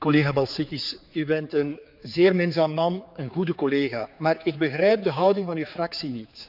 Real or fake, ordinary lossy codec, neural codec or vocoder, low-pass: fake; none; codec, 16 kHz, 4 kbps, X-Codec, HuBERT features, trained on general audio; 5.4 kHz